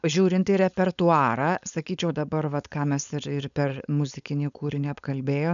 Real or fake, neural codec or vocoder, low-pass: fake; codec, 16 kHz, 4.8 kbps, FACodec; 7.2 kHz